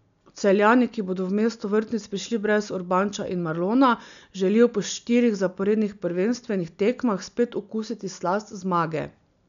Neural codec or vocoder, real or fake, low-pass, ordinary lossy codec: none; real; 7.2 kHz; none